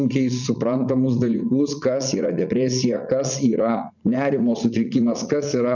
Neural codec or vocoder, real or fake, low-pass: vocoder, 44.1 kHz, 80 mel bands, Vocos; fake; 7.2 kHz